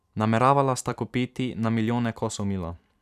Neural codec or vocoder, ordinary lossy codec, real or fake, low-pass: vocoder, 44.1 kHz, 128 mel bands every 256 samples, BigVGAN v2; none; fake; 14.4 kHz